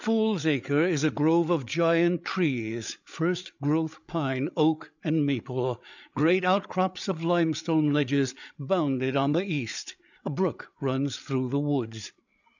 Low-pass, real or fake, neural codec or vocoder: 7.2 kHz; fake; codec, 16 kHz, 8 kbps, FreqCodec, larger model